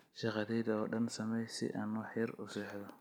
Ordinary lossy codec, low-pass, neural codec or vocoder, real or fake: none; none; none; real